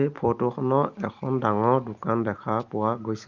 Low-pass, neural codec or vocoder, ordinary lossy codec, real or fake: 7.2 kHz; none; Opus, 32 kbps; real